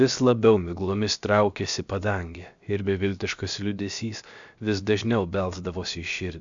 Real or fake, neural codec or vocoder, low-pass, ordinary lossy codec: fake; codec, 16 kHz, 0.7 kbps, FocalCodec; 7.2 kHz; MP3, 64 kbps